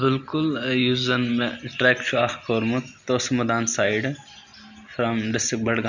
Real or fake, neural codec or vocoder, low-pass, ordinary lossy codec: real; none; 7.2 kHz; none